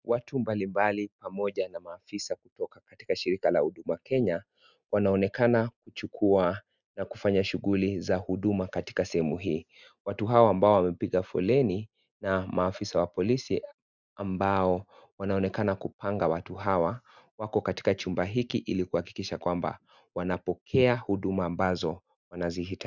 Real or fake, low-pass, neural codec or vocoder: real; 7.2 kHz; none